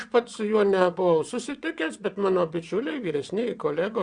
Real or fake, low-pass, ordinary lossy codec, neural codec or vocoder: fake; 9.9 kHz; Opus, 64 kbps; vocoder, 22.05 kHz, 80 mel bands, WaveNeXt